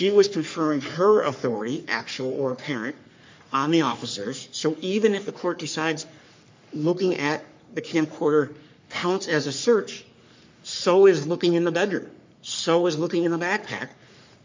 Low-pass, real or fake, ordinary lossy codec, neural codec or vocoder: 7.2 kHz; fake; MP3, 48 kbps; codec, 44.1 kHz, 3.4 kbps, Pupu-Codec